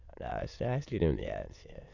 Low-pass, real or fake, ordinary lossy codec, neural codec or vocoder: 7.2 kHz; fake; none; autoencoder, 22.05 kHz, a latent of 192 numbers a frame, VITS, trained on many speakers